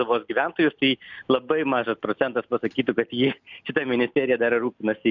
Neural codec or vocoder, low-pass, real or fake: none; 7.2 kHz; real